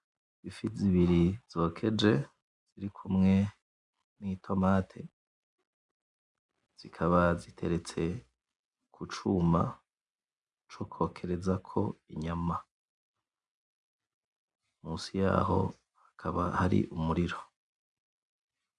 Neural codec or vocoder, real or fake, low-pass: none; real; 10.8 kHz